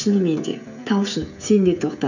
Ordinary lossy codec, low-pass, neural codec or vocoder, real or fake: none; 7.2 kHz; codec, 16 kHz, 16 kbps, FreqCodec, smaller model; fake